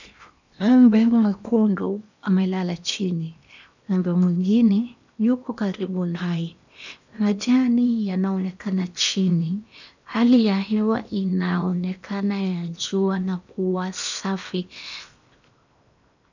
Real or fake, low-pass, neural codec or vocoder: fake; 7.2 kHz; codec, 16 kHz in and 24 kHz out, 0.8 kbps, FocalCodec, streaming, 65536 codes